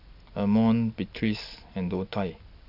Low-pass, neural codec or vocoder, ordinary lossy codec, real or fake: 5.4 kHz; none; none; real